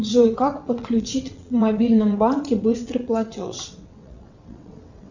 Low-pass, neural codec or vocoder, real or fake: 7.2 kHz; vocoder, 22.05 kHz, 80 mel bands, WaveNeXt; fake